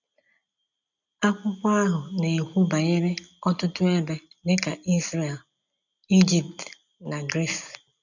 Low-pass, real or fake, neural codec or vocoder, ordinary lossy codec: 7.2 kHz; real; none; none